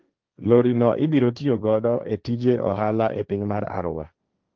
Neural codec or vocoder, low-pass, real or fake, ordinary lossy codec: codec, 16 kHz, 1.1 kbps, Voila-Tokenizer; 7.2 kHz; fake; Opus, 24 kbps